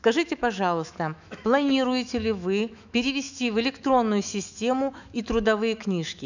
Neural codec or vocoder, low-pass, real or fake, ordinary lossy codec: autoencoder, 48 kHz, 128 numbers a frame, DAC-VAE, trained on Japanese speech; 7.2 kHz; fake; none